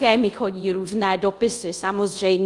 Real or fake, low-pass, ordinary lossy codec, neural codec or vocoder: fake; 10.8 kHz; Opus, 32 kbps; codec, 24 kHz, 0.5 kbps, DualCodec